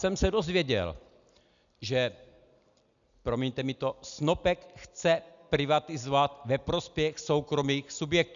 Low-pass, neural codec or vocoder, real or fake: 7.2 kHz; none; real